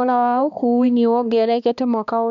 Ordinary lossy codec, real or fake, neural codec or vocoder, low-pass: none; fake; codec, 16 kHz, 2 kbps, X-Codec, HuBERT features, trained on balanced general audio; 7.2 kHz